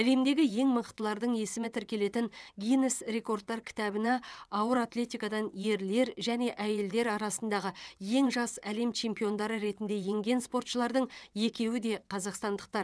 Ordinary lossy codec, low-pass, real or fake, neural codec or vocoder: none; none; fake; vocoder, 22.05 kHz, 80 mel bands, WaveNeXt